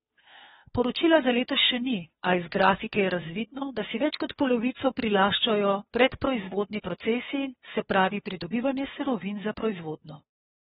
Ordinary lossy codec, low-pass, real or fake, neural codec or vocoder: AAC, 16 kbps; 7.2 kHz; fake; codec, 16 kHz, 2 kbps, FunCodec, trained on Chinese and English, 25 frames a second